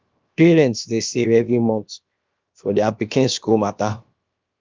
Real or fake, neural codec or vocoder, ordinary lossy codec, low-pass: fake; codec, 16 kHz, about 1 kbps, DyCAST, with the encoder's durations; Opus, 24 kbps; 7.2 kHz